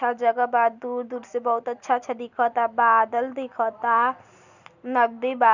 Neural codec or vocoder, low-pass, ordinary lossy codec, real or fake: none; 7.2 kHz; none; real